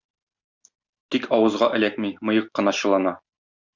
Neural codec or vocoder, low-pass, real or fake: none; 7.2 kHz; real